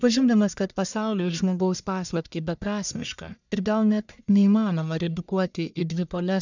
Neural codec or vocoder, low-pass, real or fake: codec, 44.1 kHz, 1.7 kbps, Pupu-Codec; 7.2 kHz; fake